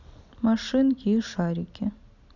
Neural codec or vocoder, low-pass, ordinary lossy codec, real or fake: none; 7.2 kHz; none; real